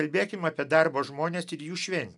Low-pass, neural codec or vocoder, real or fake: 10.8 kHz; none; real